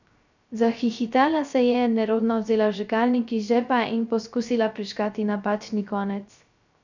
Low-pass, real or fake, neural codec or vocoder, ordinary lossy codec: 7.2 kHz; fake; codec, 16 kHz, 0.3 kbps, FocalCodec; none